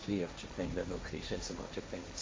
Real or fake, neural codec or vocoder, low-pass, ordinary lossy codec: fake; codec, 16 kHz, 1.1 kbps, Voila-Tokenizer; none; none